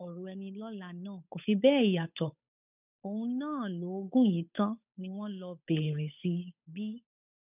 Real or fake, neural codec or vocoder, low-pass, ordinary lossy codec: fake; codec, 16 kHz, 8 kbps, FunCodec, trained on Chinese and English, 25 frames a second; 3.6 kHz; none